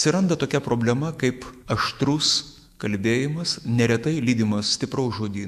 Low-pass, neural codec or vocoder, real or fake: 14.4 kHz; autoencoder, 48 kHz, 128 numbers a frame, DAC-VAE, trained on Japanese speech; fake